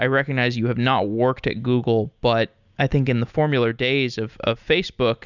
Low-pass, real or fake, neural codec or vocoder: 7.2 kHz; real; none